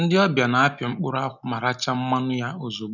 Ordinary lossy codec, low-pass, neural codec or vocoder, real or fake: none; 7.2 kHz; none; real